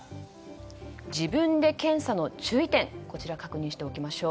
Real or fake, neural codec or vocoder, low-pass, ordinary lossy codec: real; none; none; none